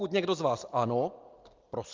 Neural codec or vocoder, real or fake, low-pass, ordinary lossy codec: none; real; 7.2 kHz; Opus, 24 kbps